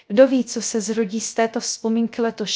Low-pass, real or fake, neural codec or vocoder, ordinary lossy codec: none; fake; codec, 16 kHz, 0.3 kbps, FocalCodec; none